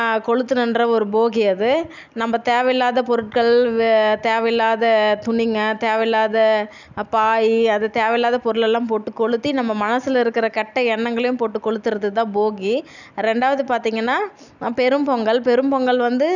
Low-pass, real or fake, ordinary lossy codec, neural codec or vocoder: 7.2 kHz; real; none; none